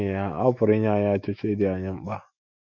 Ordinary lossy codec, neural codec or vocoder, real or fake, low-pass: Opus, 64 kbps; autoencoder, 48 kHz, 128 numbers a frame, DAC-VAE, trained on Japanese speech; fake; 7.2 kHz